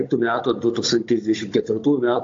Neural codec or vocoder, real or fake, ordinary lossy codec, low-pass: codec, 16 kHz, 16 kbps, FunCodec, trained on Chinese and English, 50 frames a second; fake; AAC, 48 kbps; 7.2 kHz